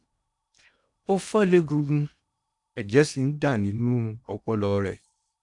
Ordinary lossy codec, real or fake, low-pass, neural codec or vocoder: none; fake; 10.8 kHz; codec, 16 kHz in and 24 kHz out, 0.8 kbps, FocalCodec, streaming, 65536 codes